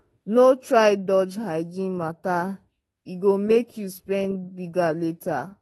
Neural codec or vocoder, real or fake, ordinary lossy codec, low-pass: autoencoder, 48 kHz, 32 numbers a frame, DAC-VAE, trained on Japanese speech; fake; AAC, 32 kbps; 19.8 kHz